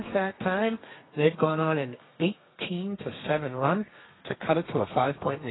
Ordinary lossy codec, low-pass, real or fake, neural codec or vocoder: AAC, 16 kbps; 7.2 kHz; fake; codec, 32 kHz, 1.9 kbps, SNAC